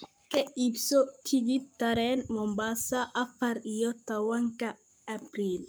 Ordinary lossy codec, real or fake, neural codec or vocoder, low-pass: none; fake; codec, 44.1 kHz, 7.8 kbps, Pupu-Codec; none